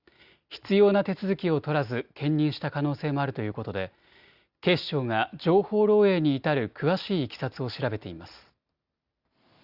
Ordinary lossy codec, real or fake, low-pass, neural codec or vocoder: Opus, 64 kbps; real; 5.4 kHz; none